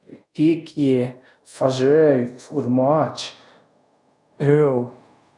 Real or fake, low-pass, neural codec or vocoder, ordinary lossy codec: fake; 10.8 kHz; codec, 24 kHz, 0.5 kbps, DualCodec; none